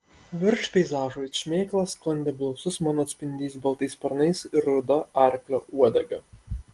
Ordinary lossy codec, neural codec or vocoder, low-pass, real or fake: Opus, 24 kbps; none; 10.8 kHz; real